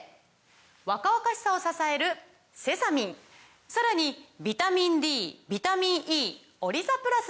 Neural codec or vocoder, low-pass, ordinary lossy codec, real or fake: none; none; none; real